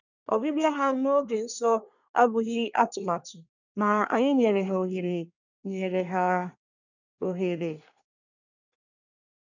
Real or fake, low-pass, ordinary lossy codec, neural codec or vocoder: fake; 7.2 kHz; none; codec, 24 kHz, 1 kbps, SNAC